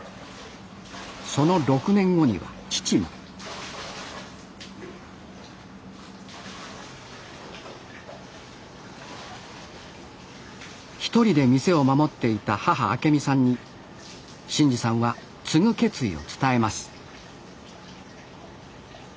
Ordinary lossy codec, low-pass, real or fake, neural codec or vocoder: none; none; real; none